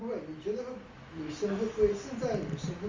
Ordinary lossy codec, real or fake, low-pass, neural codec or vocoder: Opus, 32 kbps; real; 7.2 kHz; none